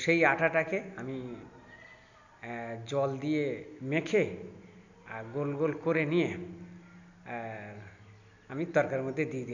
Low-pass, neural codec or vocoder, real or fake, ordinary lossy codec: 7.2 kHz; none; real; none